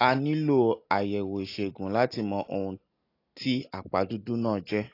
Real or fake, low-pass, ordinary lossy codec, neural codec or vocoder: real; 5.4 kHz; AAC, 32 kbps; none